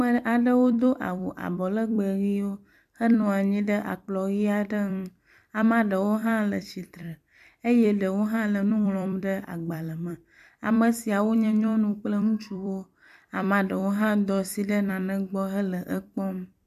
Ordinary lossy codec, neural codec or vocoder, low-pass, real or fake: MP3, 96 kbps; vocoder, 44.1 kHz, 128 mel bands every 256 samples, BigVGAN v2; 14.4 kHz; fake